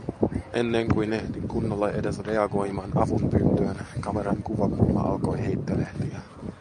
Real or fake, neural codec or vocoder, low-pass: real; none; 10.8 kHz